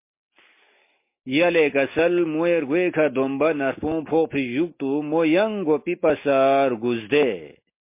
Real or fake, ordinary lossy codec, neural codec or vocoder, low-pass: real; MP3, 24 kbps; none; 3.6 kHz